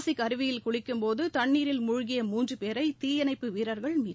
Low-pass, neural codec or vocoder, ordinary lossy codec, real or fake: none; none; none; real